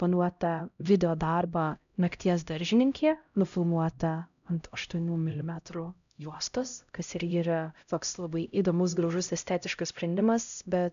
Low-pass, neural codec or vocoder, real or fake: 7.2 kHz; codec, 16 kHz, 0.5 kbps, X-Codec, HuBERT features, trained on LibriSpeech; fake